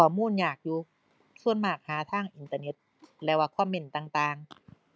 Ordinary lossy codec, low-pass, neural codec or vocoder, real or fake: none; none; none; real